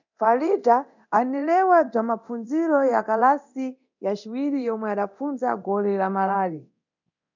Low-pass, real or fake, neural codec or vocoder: 7.2 kHz; fake; codec, 24 kHz, 0.9 kbps, DualCodec